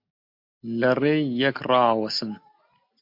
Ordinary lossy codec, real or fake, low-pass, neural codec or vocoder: MP3, 48 kbps; real; 5.4 kHz; none